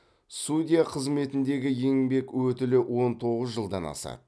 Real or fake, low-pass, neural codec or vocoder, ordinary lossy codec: real; none; none; none